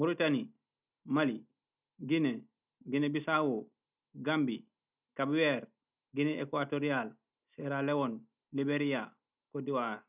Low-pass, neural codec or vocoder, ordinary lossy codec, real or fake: 3.6 kHz; none; none; real